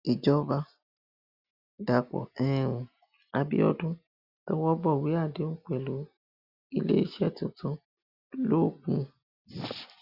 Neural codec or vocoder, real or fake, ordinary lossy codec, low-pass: none; real; Opus, 64 kbps; 5.4 kHz